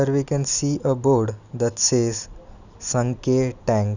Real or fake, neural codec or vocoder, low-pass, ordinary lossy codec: real; none; 7.2 kHz; none